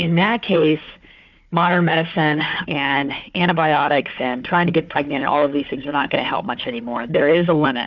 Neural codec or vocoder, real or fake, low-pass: codec, 16 kHz, 4 kbps, FunCodec, trained on Chinese and English, 50 frames a second; fake; 7.2 kHz